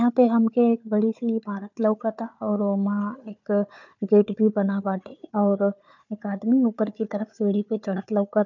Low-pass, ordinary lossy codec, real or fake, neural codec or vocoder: 7.2 kHz; none; fake; codec, 16 kHz, 4 kbps, FunCodec, trained on Chinese and English, 50 frames a second